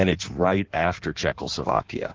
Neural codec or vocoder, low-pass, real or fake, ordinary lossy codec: codec, 16 kHz in and 24 kHz out, 1.1 kbps, FireRedTTS-2 codec; 7.2 kHz; fake; Opus, 16 kbps